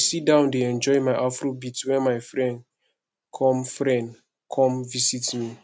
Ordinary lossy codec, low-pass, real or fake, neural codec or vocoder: none; none; real; none